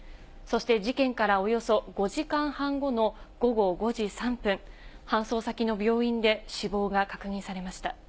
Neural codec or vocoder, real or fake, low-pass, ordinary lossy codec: none; real; none; none